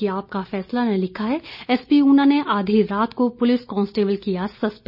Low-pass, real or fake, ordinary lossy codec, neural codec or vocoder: 5.4 kHz; real; none; none